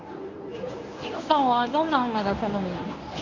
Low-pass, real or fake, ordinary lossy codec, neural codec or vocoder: 7.2 kHz; fake; none; codec, 24 kHz, 0.9 kbps, WavTokenizer, medium speech release version 1